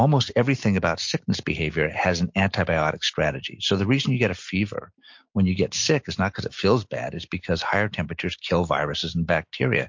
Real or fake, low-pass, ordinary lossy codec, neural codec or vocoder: real; 7.2 kHz; MP3, 48 kbps; none